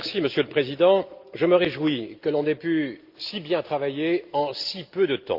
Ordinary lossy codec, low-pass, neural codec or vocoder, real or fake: Opus, 24 kbps; 5.4 kHz; none; real